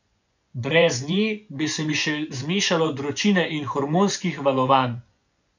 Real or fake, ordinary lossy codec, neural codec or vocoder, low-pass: fake; none; vocoder, 24 kHz, 100 mel bands, Vocos; 7.2 kHz